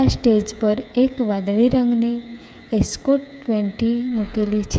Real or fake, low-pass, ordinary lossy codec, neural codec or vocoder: fake; none; none; codec, 16 kHz, 8 kbps, FreqCodec, smaller model